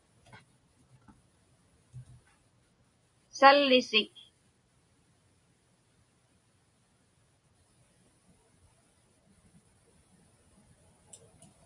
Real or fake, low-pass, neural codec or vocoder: real; 10.8 kHz; none